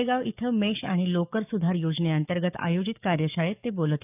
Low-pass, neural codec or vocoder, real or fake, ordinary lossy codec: 3.6 kHz; codec, 44.1 kHz, 7.8 kbps, DAC; fake; none